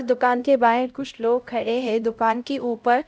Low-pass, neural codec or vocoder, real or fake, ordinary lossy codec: none; codec, 16 kHz, 0.5 kbps, X-Codec, HuBERT features, trained on LibriSpeech; fake; none